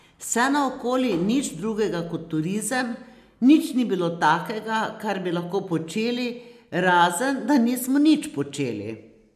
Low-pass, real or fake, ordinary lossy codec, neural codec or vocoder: 14.4 kHz; real; none; none